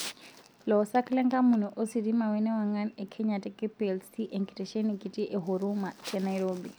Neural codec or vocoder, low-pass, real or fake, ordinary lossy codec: none; none; real; none